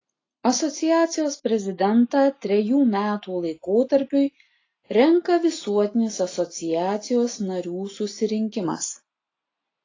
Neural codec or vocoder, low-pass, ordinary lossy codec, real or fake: none; 7.2 kHz; AAC, 32 kbps; real